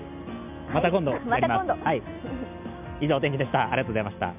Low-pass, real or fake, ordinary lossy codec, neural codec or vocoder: 3.6 kHz; real; none; none